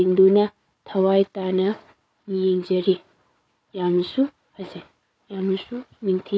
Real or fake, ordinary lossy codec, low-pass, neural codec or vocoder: fake; none; none; codec, 16 kHz, 4 kbps, FunCodec, trained on Chinese and English, 50 frames a second